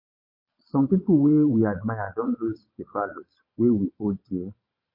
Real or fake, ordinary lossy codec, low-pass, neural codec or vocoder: fake; MP3, 32 kbps; 5.4 kHz; vocoder, 22.05 kHz, 80 mel bands, Vocos